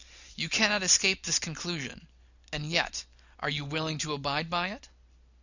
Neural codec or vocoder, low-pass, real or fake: none; 7.2 kHz; real